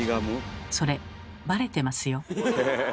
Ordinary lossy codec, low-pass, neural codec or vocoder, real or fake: none; none; none; real